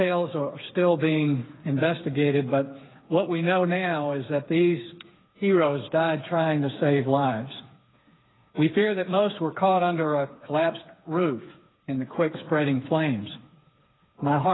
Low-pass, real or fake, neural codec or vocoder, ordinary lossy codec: 7.2 kHz; fake; codec, 16 kHz, 4 kbps, FreqCodec, smaller model; AAC, 16 kbps